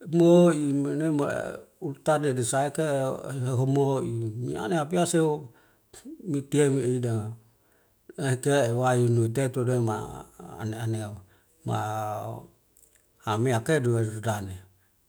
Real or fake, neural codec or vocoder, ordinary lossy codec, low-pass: fake; autoencoder, 48 kHz, 128 numbers a frame, DAC-VAE, trained on Japanese speech; none; none